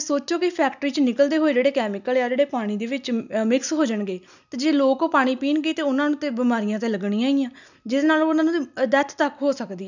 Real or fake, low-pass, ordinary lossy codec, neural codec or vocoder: real; 7.2 kHz; none; none